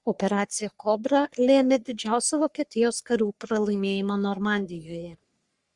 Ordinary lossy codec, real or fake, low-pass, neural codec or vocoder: Opus, 64 kbps; fake; 10.8 kHz; codec, 44.1 kHz, 3.4 kbps, Pupu-Codec